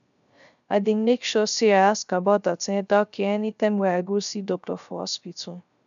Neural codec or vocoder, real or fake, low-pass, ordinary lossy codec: codec, 16 kHz, 0.3 kbps, FocalCodec; fake; 7.2 kHz; none